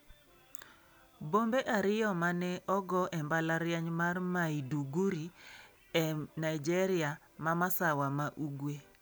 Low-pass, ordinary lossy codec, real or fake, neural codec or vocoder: none; none; real; none